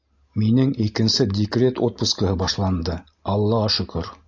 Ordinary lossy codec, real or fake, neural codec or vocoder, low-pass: AAC, 48 kbps; real; none; 7.2 kHz